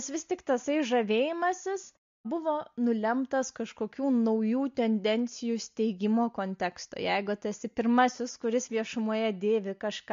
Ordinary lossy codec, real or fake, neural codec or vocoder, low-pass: MP3, 48 kbps; real; none; 7.2 kHz